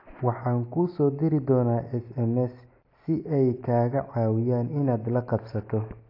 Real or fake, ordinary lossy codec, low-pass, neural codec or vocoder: real; AAC, 32 kbps; 5.4 kHz; none